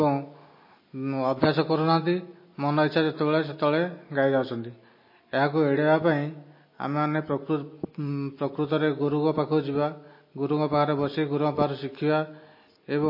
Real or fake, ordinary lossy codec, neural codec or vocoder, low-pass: real; MP3, 24 kbps; none; 5.4 kHz